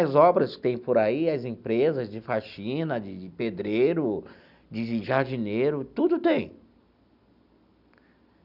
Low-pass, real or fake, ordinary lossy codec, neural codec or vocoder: 5.4 kHz; real; none; none